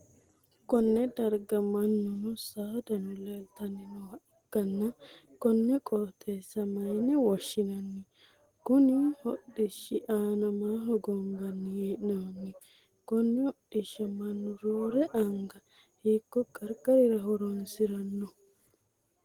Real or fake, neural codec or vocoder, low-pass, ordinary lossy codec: real; none; 19.8 kHz; Opus, 16 kbps